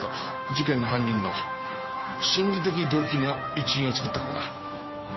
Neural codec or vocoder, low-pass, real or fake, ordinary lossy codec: codec, 16 kHz, 2 kbps, FunCodec, trained on Chinese and English, 25 frames a second; 7.2 kHz; fake; MP3, 24 kbps